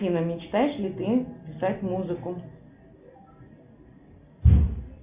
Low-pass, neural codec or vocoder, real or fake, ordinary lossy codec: 3.6 kHz; none; real; Opus, 64 kbps